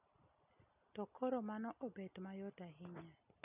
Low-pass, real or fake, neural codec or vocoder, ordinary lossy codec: 3.6 kHz; real; none; none